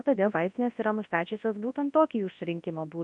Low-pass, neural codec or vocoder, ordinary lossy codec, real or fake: 10.8 kHz; codec, 24 kHz, 0.9 kbps, WavTokenizer, large speech release; MP3, 48 kbps; fake